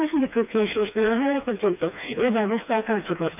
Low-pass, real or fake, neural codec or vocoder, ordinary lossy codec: 3.6 kHz; fake; codec, 16 kHz, 2 kbps, FreqCodec, smaller model; none